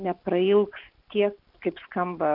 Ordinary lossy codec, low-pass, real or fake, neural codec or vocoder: AAC, 48 kbps; 5.4 kHz; real; none